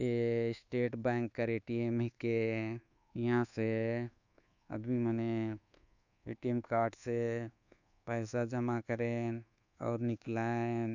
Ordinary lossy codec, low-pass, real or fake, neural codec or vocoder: none; 7.2 kHz; fake; codec, 24 kHz, 1.2 kbps, DualCodec